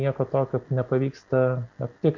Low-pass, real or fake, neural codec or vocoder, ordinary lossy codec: 7.2 kHz; real; none; MP3, 64 kbps